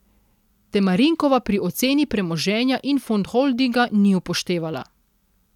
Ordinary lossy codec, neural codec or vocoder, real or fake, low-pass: none; none; real; 19.8 kHz